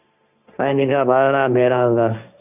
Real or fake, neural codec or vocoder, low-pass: fake; codec, 16 kHz, 1.1 kbps, Voila-Tokenizer; 3.6 kHz